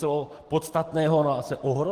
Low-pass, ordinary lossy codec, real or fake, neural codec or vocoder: 14.4 kHz; Opus, 16 kbps; real; none